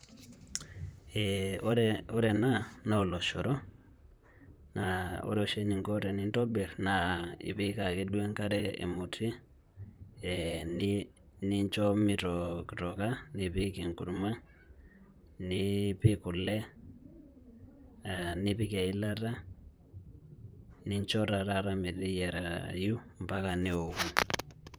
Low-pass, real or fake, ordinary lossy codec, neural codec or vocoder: none; fake; none; vocoder, 44.1 kHz, 128 mel bands, Pupu-Vocoder